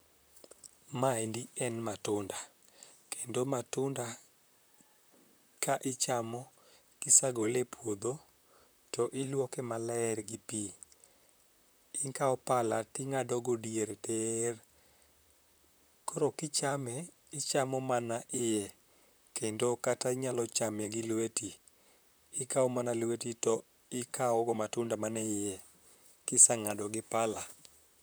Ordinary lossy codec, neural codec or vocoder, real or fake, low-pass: none; vocoder, 44.1 kHz, 128 mel bands, Pupu-Vocoder; fake; none